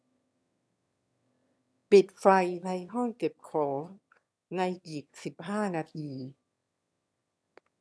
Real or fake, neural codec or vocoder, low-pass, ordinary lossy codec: fake; autoencoder, 22.05 kHz, a latent of 192 numbers a frame, VITS, trained on one speaker; none; none